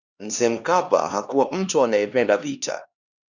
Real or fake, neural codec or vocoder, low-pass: fake; codec, 16 kHz, 2 kbps, X-Codec, HuBERT features, trained on LibriSpeech; 7.2 kHz